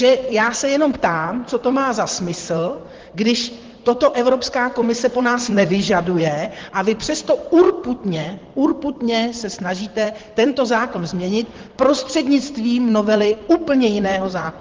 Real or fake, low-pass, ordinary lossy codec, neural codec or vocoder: fake; 7.2 kHz; Opus, 16 kbps; vocoder, 44.1 kHz, 128 mel bands, Pupu-Vocoder